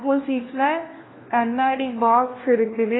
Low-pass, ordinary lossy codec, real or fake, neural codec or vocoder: 7.2 kHz; AAC, 16 kbps; fake; codec, 16 kHz, 1 kbps, FunCodec, trained on LibriTTS, 50 frames a second